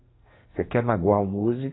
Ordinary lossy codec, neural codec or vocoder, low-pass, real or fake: AAC, 16 kbps; codec, 44.1 kHz, 2.6 kbps, SNAC; 7.2 kHz; fake